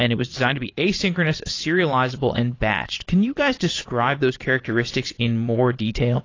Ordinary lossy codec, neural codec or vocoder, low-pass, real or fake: AAC, 32 kbps; vocoder, 22.05 kHz, 80 mel bands, Vocos; 7.2 kHz; fake